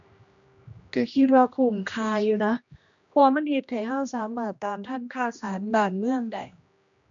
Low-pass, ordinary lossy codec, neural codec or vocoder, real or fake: 7.2 kHz; AAC, 64 kbps; codec, 16 kHz, 1 kbps, X-Codec, HuBERT features, trained on general audio; fake